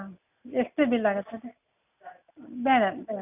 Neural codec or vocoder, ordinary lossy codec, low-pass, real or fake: none; none; 3.6 kHz; real